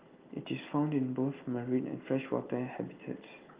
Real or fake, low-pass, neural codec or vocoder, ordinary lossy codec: real; 3.6 kHz; none; Opus, 32 kbps